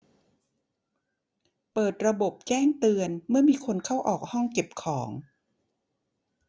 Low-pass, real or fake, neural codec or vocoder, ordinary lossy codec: none; real; none; none